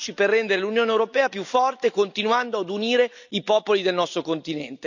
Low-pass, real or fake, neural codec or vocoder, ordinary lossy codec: 7.2 kHz; real; none; none